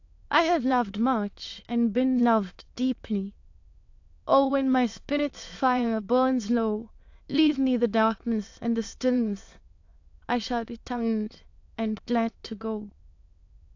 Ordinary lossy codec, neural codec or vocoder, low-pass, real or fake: AAC, 48 kbps; autoencoder, 22.05 kHz, a latent of 192 numbers a frame, VITS, trained on many speakers; 7.2 kHz; fake